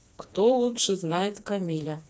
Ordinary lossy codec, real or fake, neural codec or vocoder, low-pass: none; fake; codec, 16 kHz, 2 kbps, FreqCodec, smaller model; none